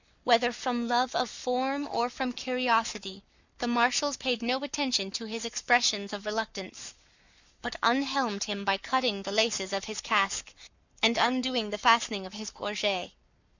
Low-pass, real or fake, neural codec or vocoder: 7.2 kHz; fake; codec, 44.1 kHz, 7.8 kbps, Pupu-Codec